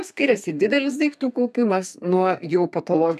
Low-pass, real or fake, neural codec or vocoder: 14.4 kHz; fake; codec, 32 kHz, 1.9 kbps, SNAC